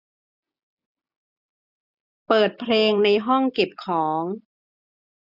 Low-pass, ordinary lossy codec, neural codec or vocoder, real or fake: 5.4 kHz; none; none; real